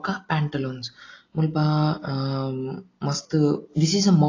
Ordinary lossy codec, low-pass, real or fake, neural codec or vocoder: AAC, 32 kbps; 7.2 kHz; real; none